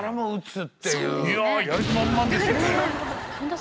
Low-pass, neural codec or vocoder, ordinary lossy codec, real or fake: none; none; none; real